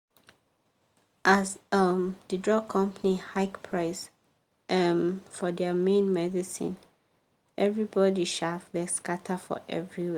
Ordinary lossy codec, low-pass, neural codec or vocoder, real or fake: Opus, 32 kbps; 19.8 kHz; none; real